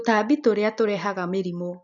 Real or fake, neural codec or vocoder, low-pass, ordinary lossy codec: real; none; 7.2 kHz; none